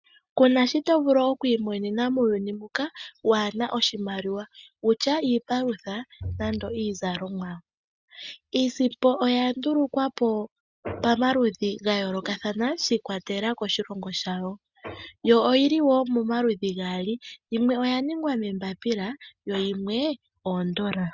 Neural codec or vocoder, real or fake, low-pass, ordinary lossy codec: none; real; 7.2 kHz; Opus, 64 kbps